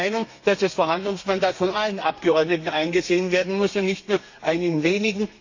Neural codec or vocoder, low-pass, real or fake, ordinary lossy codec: codec, 32 kHz, 1.9 kbps, SNAC; 7.2 kHz; fake; AAC, 48 kbps